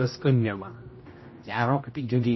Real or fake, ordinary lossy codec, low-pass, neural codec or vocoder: fake; MP3, 24 kbps; 7.2 kHz; codec, 16 kHz, 0.5 kbps, X-Codec, HuBERT features, trained on general audio